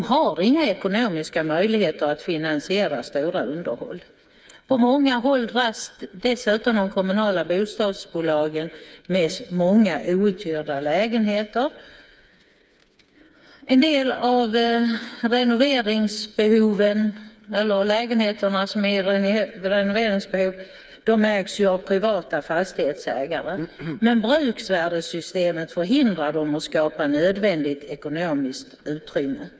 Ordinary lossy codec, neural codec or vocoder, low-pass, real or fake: none; codec, 16 kHz, 4 kbps, FreqCodec, smaller model; none; fake